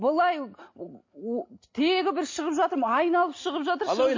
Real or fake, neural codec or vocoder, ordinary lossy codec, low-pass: real; none; MP3, 32 kbps; 7.2 kHz